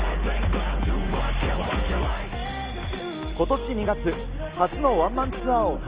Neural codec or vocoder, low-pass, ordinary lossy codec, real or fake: none; 3.6 kHz; Opus, 32 kbps; real